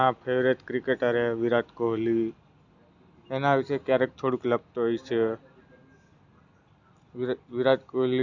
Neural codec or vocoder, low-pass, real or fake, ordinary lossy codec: none; 7.2 kHz; real; none